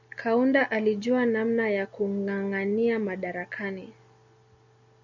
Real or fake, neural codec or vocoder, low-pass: real; none; 7.2 kHz